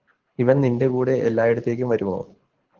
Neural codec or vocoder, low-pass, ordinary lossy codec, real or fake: codec, 24 kHz, 6 kbps, HILCodec; 7.2 kHz; Opus, 16 kbps; fake